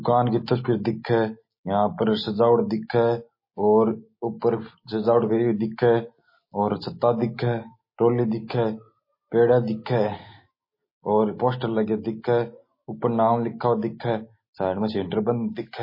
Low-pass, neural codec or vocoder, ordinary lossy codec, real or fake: 5.4 kHz; none; MP3, 24 kbps; real